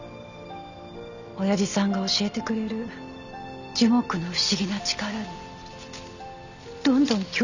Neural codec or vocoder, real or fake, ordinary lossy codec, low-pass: none; real; none; 7.2 kHz